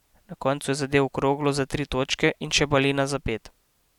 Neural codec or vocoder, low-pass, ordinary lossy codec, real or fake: none; 19.8 kHz; none; real